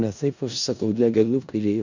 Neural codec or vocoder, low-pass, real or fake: codec, 16 kHz in and 24 kHz out, 0.4 kbps, LongCat-Audio-Codec, four codebook decoder; 7.2 kHz; fake